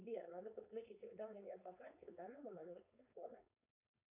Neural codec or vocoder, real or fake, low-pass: codec, 16 kHz, 4.8 kbps, FACodec; fake; 3.6 kHz